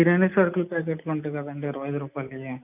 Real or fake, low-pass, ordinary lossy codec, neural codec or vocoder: real; 3.6 kHz; none; none